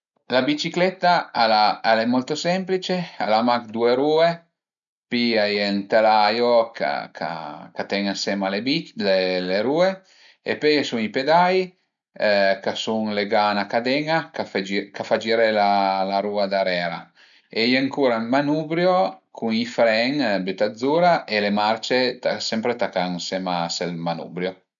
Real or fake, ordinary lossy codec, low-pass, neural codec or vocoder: real; none; 7.2 kHz; none